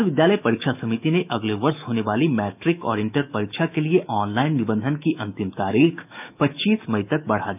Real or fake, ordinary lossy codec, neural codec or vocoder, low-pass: fake; none; autoencoder, 48 kHz, 128 numbers a frame, DAC-VAE, trained on Japanese speech; 3.6 kHz